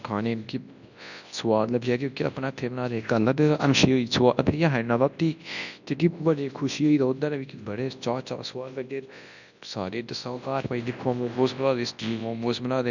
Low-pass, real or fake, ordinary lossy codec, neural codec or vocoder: 7.2 kHz; fake; none; codec, 24 kHz, 0.9 kbps, WavTokenizer, large speech release